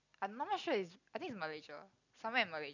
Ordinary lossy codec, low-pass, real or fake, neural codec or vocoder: none; 7.2 kHz; real; none